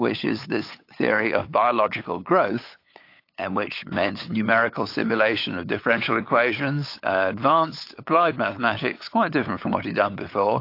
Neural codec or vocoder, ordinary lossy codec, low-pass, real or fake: codec, 16 kHz, 8 kbps, FunCodec, trained on LibriTTS, 25 frames a second; AAC, 32 kbps; 5.4 kHz; fake